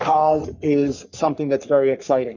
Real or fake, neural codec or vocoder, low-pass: fake; codec, 44.1 kHz, 3.4 kbps, Pupu-Codec; 7.2 kHz